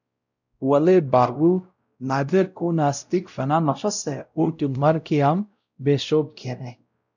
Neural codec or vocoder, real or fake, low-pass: codec, 16 kHz, 0.5 kbps, X-Codec, WavLM features, trained on Multilingual LibriSpeech; fake; 7.2 kHz